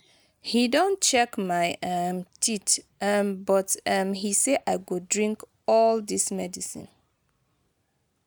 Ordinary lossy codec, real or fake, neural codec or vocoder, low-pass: none; real; none; none